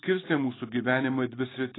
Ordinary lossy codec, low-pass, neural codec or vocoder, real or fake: AAC, 16 kbps; 7.2 kHz; none; real